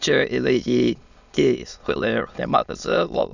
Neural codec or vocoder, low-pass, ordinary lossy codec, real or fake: autoencoder, 22.05 kHz, a latent of 192 numbers a frame, VITS, trained on many speakers; 7.2 kHz; none; fake